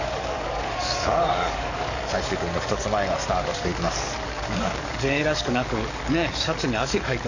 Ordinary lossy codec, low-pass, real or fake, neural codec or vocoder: none; 7.2 kHz; fake; codec, 44.1 kHz, 7.8 kbps, DAC